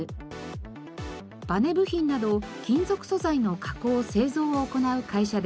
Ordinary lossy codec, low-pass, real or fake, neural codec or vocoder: none; none; real; none